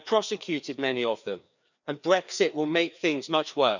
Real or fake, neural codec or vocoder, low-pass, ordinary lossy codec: fake; codec, 16 kHz, 2 kbps, FreqCodec, larger model; 7.2 kHz; none